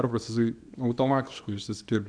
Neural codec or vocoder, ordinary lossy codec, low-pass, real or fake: codec, 24 kHz, 0.9 kbps, WavTokenizer, small release; AAC, 64 kbps; 9.9 kHz; fake